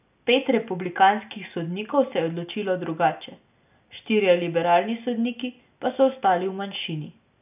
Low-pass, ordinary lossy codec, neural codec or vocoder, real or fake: 3.6 kHz; none; none; real